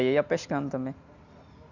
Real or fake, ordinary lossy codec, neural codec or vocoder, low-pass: real; none; none; 7.2 kHz